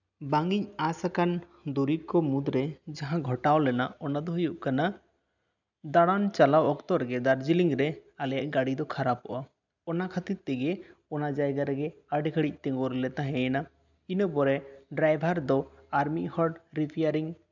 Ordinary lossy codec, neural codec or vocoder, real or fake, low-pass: none; none; real; 7.2 kHz